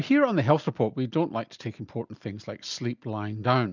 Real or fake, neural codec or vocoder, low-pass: real; none; 7.2 kHz